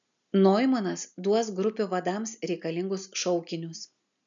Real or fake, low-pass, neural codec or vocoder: real; 7.2 kHz; none